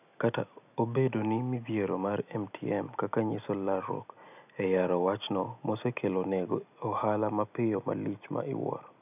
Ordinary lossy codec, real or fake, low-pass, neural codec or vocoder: none; real; 3.6 kHz; none